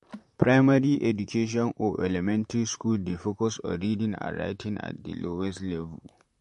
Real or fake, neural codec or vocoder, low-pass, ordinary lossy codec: fake; vocoder, 44.1 kHz, 128 mel bands, Pupu-Vocoder; 14.4 kHz; MP3, 48 kbps